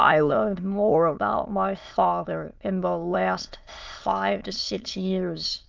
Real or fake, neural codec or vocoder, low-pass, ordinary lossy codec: fake; autoencoder, 22.05 kHz, a latent of 192 numbers a frame, VITS, trained on many speakers; 7.2 kHz; Opus, 32 kbps